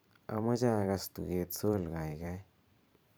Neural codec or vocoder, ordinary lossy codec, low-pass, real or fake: vocoder, 44.1 kHz, 128 mel bands every 512 samples, BigVGAN v2; none; none; fake